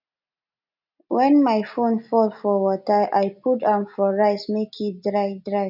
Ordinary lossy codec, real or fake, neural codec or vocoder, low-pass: none; real; none; 5.4 kHz